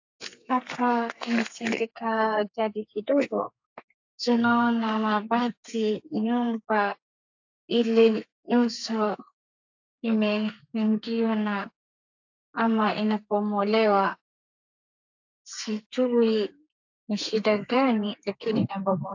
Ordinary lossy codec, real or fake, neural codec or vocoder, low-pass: AAC, 48 kbps; fake; codec, 32 kHz, 1.9 kbps, SNAC; 7.2 kHz